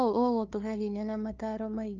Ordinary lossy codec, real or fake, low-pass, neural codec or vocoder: Opus, 24 kbps; fake; 7.2 kHz; codec, 16 kHz, 2 kbps, FunCodec, trained on LibriTTS, 25 frames a second